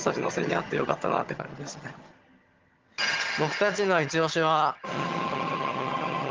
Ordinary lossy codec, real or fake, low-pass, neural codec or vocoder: Opus, 16 kbps; fake; 7.2 kHz; vocoder, 22.05 kHz, 80 mel bands, HiFi-GAN